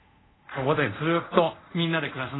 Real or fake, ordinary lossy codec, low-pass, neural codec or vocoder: fake; AAC, 16 kbps; 7.2 kHz; codec, 24 kHz, 0.5 kbps, DualCodec